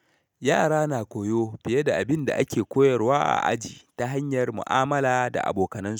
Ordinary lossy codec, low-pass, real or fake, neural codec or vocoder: none; none; real; none